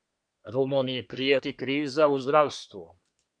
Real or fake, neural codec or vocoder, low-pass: fake; codec, 24 kHz, 1 kbps, SNAC; 9.9 kHz